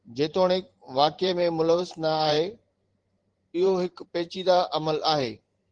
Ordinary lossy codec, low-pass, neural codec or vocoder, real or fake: Opus, 16 kbps; 9.9 kHz; vocoder, 44.1 kHz, 128 mel bands every 512 samples, BigVGAN v2; fake